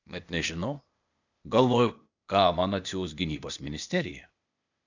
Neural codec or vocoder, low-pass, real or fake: codec, 16 kHz, 0.8 kbps, ZipCodec; 7.2 kHz; fake